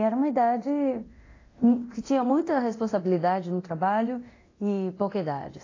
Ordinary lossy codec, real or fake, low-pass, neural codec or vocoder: AAC, 32 kbps; fake; 7.2 kHz; codec, 24 kHz, 0.9 kbps, DualCodec